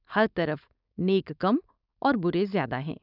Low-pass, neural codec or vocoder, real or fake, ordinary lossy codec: 5.4 kHz; codec, 16 kHz, 4.8 kbps, FACodec; fake; none